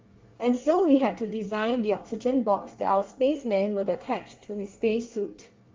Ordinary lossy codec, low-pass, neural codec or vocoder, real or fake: Opus, 32 kbps; 7.2 kHz; codec, 24 kHz, 1 kbps, SNAC; fake